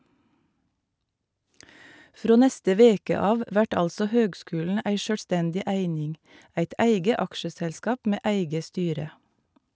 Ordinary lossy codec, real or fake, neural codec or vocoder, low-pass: none; real; none; none